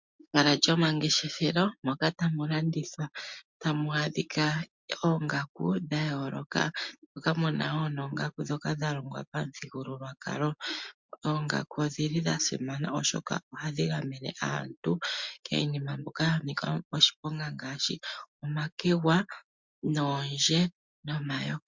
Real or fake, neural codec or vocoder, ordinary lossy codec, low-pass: real; none; MP3, 64 kbps; 7.2 kHz